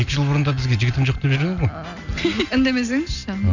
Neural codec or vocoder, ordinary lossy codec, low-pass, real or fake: none; none; 7.2 kHz; real